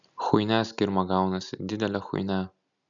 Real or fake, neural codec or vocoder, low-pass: real; none; 7.2 kHz